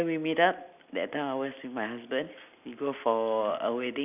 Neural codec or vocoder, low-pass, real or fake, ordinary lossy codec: none; 3.6 kHz; real; none